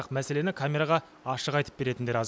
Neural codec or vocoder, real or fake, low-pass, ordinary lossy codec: none; real; none; none